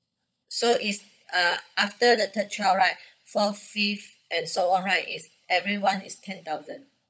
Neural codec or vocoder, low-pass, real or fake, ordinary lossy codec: codec, 16 kHz, 16 kbps, FunCodec, trained on LibriTTS, 50 frames a second; none; fake; none